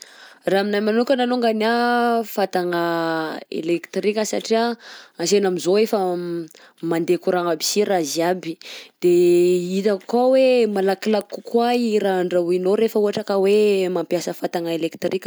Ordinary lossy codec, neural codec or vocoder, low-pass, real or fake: none; none; none; real